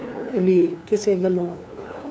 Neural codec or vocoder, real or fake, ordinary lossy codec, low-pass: codec, 16 kHz, 2 kbps, FunCodec, trained on LibriTTS, 25 frames a second; fake; none; none